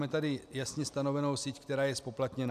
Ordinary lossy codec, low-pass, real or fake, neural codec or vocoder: MP3, 96 kbps; 14.4 kHz; real; none